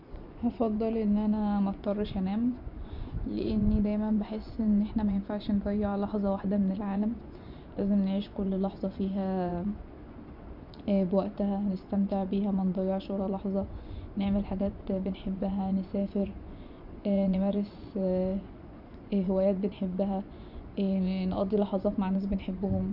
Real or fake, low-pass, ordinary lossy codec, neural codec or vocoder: fake; 5.4 kHz; none; vocoder, 24 kHz, 100 mel bands, Vocos